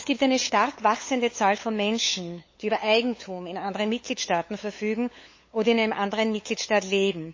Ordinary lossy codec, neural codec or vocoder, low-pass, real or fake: MP3, 32 kbps; codec, 16 kHz, 8 kbps, FunCodec, trained on LibriTTS, 25 frames a second; 7.2 kHz; fake